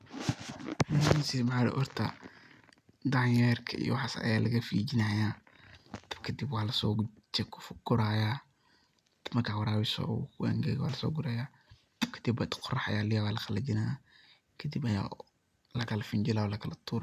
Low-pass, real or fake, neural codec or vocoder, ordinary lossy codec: 14.4 kHz; real; none; none